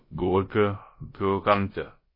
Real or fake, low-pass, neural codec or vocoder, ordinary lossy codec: fake; 5.4 kHz; codec, 16 kHz, about 1 kbps, DyCAST, with the encoder's durations; MP3, 24 kbps